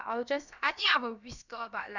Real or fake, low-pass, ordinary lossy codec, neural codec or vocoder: fake; 7.2 kHz; none; codec, 16 kHz, about 1 kbps, DyCAST, with the encoder's durations